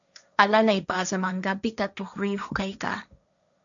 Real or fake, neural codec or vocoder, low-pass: fake; codec, 16 kHz, 1.1 kbps, Voila-Tokenizer; 7.2 kHz